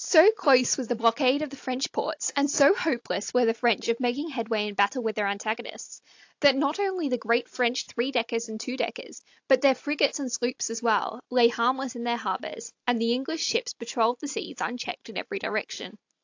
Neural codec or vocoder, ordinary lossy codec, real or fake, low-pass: none; AAC, 48 kbps; real; 7.2 kHz